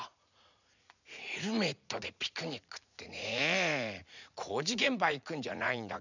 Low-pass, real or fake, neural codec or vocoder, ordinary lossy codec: 7.2 kHz; fake; vocoder, 44.1 kHz, 80 mel bands, Vocos; none